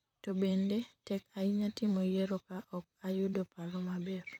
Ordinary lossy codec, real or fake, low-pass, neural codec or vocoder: none; fake; 19.8 kHz; vocoder, 44.1 kHz, 128 mel bands, Pupu-Vocoder